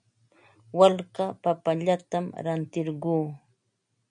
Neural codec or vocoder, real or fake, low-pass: none; real; 9.9 kHz